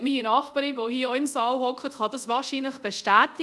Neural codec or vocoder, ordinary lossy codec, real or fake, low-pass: codec, 24 kHz, 0.5 kbps, DualCodec; none; fake; none